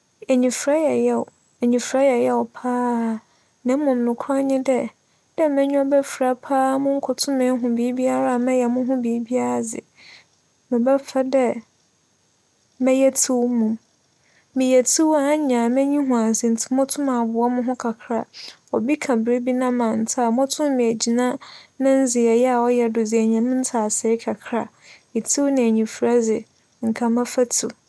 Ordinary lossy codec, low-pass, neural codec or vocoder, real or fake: none; none; none; real